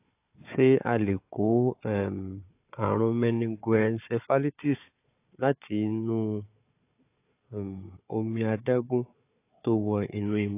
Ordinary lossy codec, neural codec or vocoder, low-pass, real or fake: AAC, 24 kbps; codec, 16 kHz, 4 kbps, FunCodec, trained on Chinese and English, 50 frames a second; 3.6 kHz; fake